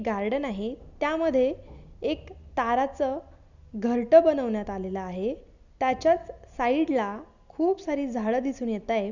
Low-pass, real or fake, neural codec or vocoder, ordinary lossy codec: 7.2 kHz; real; none; Opus, 64 kbps